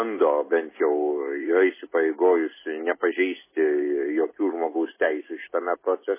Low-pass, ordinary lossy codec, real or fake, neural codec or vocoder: 3.6 kHz; MP3, 16 kbps; real; none